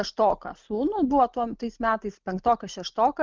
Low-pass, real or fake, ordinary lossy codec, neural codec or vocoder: 7.2 kHz; real; Opus, 32 kbps; none